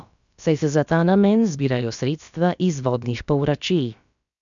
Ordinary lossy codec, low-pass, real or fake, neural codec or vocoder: none; 7.2 kHz; fake; codec, 16 kHz, about 1 kbps, DyCAST, with the encoder's durations